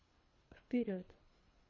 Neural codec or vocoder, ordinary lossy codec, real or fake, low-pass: codec, 24 kHz, 3 kbps, HILCodec; MP3, 32 kbps; fake; 7.2 kHz